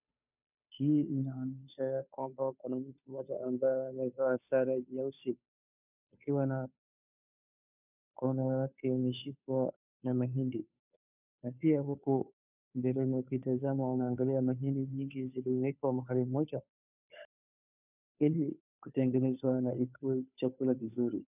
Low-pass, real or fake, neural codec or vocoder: 3.6 kHz; fake; codec, 16 kHz, 2 kbps, FunCodec, trained on Chinese and English, 25 frames a second